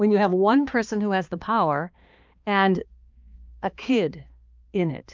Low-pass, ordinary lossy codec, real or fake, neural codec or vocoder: 7.2 kHz; Opus, 24 kbps; fake; codec, 16 kHz, 2 kbps, X-Codec, HuBERT features, trained on balanced general audio